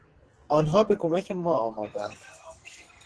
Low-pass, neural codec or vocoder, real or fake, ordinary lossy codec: 10.8 kHz; codec, 44.1 kHz, 2.6 kbps, SNAC; fake; Opus, 16 kbps